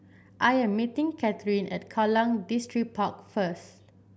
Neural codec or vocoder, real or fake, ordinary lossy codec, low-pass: none; real; none; none